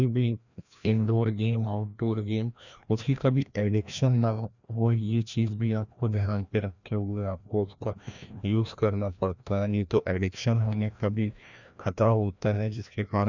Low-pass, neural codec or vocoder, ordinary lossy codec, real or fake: 7.2 kHz; codec, 16 kHz, 1 kbps, FreqCodec, larger model; none; fake